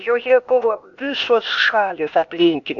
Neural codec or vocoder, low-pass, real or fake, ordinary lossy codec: codec, 16 kHz, 0.8 kbps, ZipCodec; 7.2 kHz; fake; AAC, 64 kbps